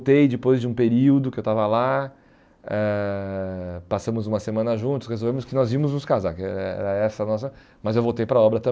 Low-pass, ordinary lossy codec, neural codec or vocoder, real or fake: none; none; none; real